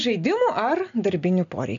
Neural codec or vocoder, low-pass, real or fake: none; 7.2 kHz; real